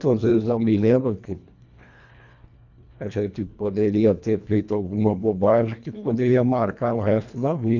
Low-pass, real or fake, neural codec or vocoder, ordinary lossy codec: 7.2 kHz; fake; codec, 24 kHz, 1.5 kbps, HILCodec; none